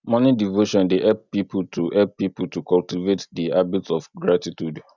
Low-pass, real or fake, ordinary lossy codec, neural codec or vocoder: 7.2 kHz; real; none; none